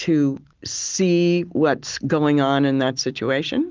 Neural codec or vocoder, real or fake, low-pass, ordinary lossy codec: none; real; 7.2 kHz; Opus, 32 kbps